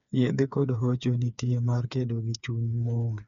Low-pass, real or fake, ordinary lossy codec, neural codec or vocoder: 7.2 kHz; fake; MP3, 96 kbps; codec, 16 kHz, 4 kbps, FreqCodec, smaller model